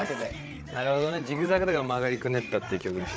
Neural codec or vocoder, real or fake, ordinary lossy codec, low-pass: codec, 16 kHz, 8 kbps, FreqCodec, larger model; fake; none; none